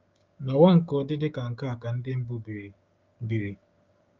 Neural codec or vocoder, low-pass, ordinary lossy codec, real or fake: codec, 16 kHz, 6 kbps, DAC; 7.2 kHz; Opus, 24 kbps; fake